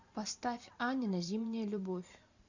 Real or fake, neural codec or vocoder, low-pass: fake; vocoder, 44.1 kHz, 128 mel bands every 512 samples, BigVGAN v2; 7.2 kHz